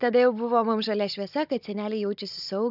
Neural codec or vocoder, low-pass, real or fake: codec, 16 kHz, 16 kbps, FunCodec, trained on Chinese and English, 50 frames a second; 5.4 kHz; fake